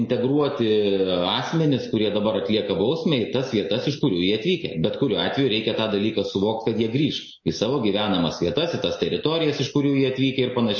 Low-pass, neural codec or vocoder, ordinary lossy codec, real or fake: 7.2 kHz; none; MP3, 32 kbps; real